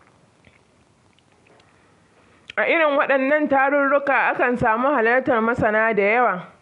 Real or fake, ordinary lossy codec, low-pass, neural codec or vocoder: real; none; 10.8 kHz; none